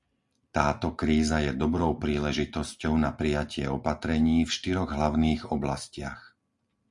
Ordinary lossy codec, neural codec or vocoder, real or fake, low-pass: Opus, 64 kbps; none; real; 10.8 kHz